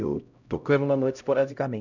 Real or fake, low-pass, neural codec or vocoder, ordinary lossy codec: fake; 7.2 kHz; codec, 16 kHz, 0.5 kbps, X-Codec, HuBERT features, trained on LibriSpeech; none